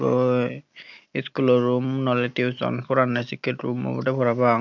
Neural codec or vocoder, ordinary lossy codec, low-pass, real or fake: none; none; 7.2 kHz; real